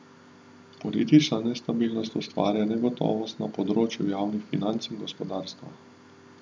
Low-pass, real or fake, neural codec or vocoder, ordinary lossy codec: none; real; none; none